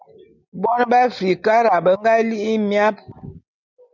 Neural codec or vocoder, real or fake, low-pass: none; real; 7.2 kHz